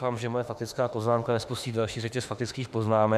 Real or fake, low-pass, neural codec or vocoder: fake; 14.4 kHz; autoencoder, 48 kHz, 32 numbers a frame, DAC-VAE, trained on Japanese speech